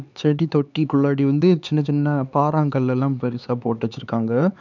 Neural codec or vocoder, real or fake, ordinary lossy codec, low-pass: codec, 16 kHz, 4 kbps, X-Codec, HuBERT features, trained on LibriSpeech; fake; none; 7.2 kHz